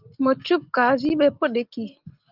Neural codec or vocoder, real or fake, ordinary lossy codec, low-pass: none; real; Opus, 32 kbps; 5.4 kHz